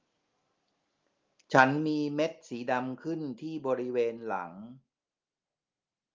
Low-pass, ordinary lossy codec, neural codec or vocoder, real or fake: 7.2 kHz; Opus, 32 kbps; none; real